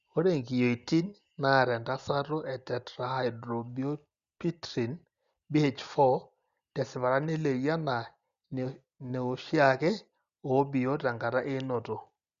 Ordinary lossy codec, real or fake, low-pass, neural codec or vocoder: Opus, 64 kbps; real; 7.2 kHz; none